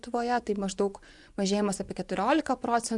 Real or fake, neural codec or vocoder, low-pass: fake; vocoder, 24 kHz, 100 mel bands, Vocos; 10.8 kHz